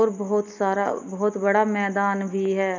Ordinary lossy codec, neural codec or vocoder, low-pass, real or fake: none; none; 7.2 kHz; real